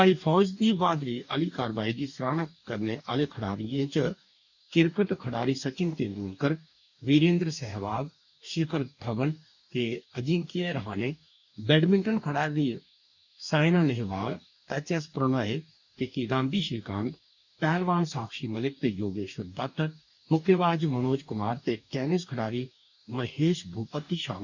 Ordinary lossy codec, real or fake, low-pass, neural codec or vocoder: none; fake; 7.2 kHz; codec, 44.1 kHz, 2.6 kbps, DAC